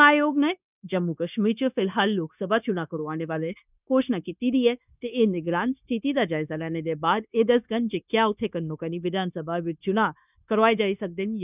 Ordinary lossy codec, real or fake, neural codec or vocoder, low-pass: none; fake; codec, 16 kHz, 0.9 kbps, LongCat-Audio-Codec; 3.6 kHz